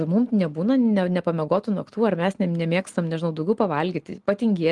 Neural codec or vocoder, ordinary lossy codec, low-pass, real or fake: none; Opus, 24 kbps; 10.8 kHz; real